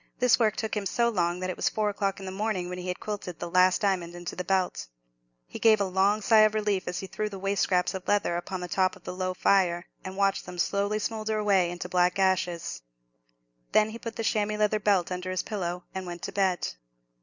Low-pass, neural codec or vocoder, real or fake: 7.2 kHz; none; real